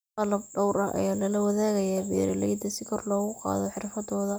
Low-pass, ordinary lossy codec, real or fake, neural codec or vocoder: none; none; real; none